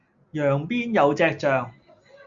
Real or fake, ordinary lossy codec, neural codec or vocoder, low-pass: real; Opus, 64 kbps; none; 7.2 kHz